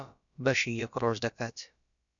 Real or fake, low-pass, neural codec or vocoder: fake; 7.2 kHz; codec, 16 kHz, about 1 kbps, DyCAST, with the encoder's durations